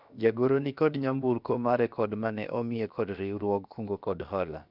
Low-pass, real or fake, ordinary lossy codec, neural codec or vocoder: 5.4 kHz; fake; none; codec, 16 kHz, about 1 kbps, DyCAST, with the encoder's durations